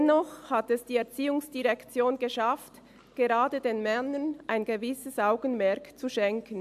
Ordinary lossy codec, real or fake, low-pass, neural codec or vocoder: AAC, 96 kbps; real; 14.4 kHz; none